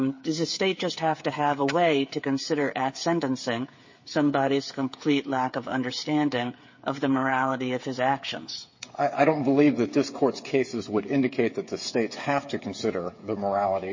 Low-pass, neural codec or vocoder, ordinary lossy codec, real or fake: 7.2 kHz; codec, 16 kHz, 8 kbps, FreqCodec, smaller model; MP3, 32 kbps; fake